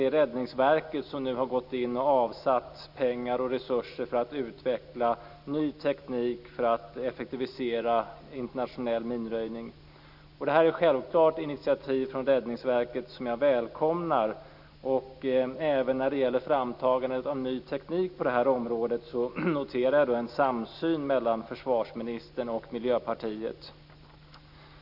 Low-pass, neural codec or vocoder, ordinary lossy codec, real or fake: 5.4 kHz; none; none; real